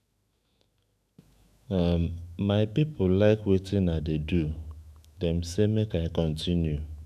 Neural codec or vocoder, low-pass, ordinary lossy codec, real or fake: autoencoder, 48 kHz, 128 numbers a frame, DAC-VAE, trained on Japanese speech; 14.4 kHz; none; fake